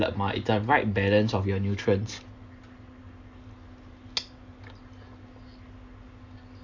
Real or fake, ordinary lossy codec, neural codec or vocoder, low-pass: real; AAC, 48 kbps; none; 7.2 kHz